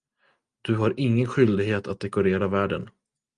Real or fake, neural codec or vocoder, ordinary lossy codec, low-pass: real; none; Opus, 32 kbps; 9.9 kHz